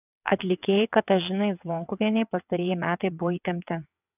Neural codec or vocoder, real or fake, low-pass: vocoder, 22.05 kHz, 80 mel bands, WaveNeXt; fake; 3.6 kHz